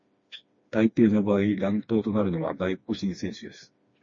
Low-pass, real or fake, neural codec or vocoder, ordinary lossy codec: 7.2 kHz; fake; codec, 16 kHz, 2 kbps, FreqCodec, smaller model; MP3, 32 kbps